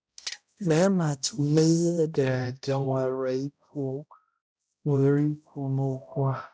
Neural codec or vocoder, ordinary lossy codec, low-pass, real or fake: codec, 16 kHz, 0.5 kbps, X-Codec, HuBERT features, trained on balanced general audio; none; none; fake